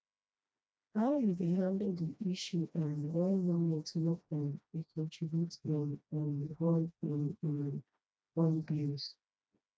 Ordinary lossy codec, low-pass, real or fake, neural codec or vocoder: none; none; fake; codec, 16 kHz, 1 kbps, FreqCodec, smaller model